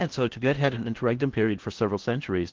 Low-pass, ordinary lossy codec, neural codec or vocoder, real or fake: 7.2 kHz; Opus, 16 kbps; codec, 16 kHz in and 24 kHz out, 0.6 kbps, FocalCodec, streaming, 4096 codes; fake